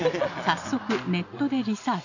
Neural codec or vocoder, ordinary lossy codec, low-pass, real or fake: none; none; 7.2 kHz; real